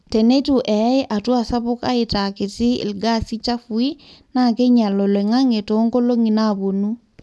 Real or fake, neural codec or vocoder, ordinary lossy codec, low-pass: real; none; none; none